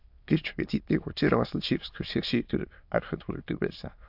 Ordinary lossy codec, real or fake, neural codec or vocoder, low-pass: AAC, 48 kbps; fake; autoencoder, 22.05 kHz, a latent of 192 numbers a frame, VITS, trained on many speakers; 5.4 kHz